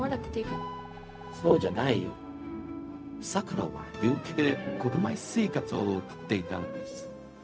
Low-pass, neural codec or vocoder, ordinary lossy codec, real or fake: none; codec, 16 kHz, 0.4 kbps, LongCat-Audio-Codec; none; fake